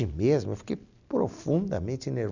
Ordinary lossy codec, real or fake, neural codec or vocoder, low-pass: none; real; none; 7.2 kHz